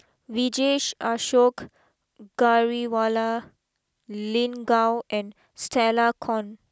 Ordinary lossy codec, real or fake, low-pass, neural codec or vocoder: none; real; none; none